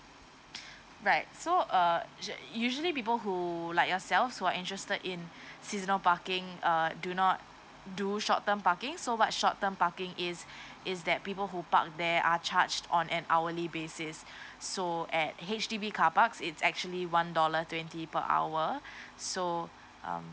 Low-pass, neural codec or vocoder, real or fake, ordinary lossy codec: none; none; real; none